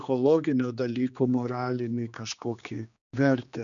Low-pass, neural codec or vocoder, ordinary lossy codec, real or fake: 7.2 kHz; codec, 16 kHz, 2 kbps, X-Codec, HuBERT features, trained on general audio; MP3, 96 kbps; fake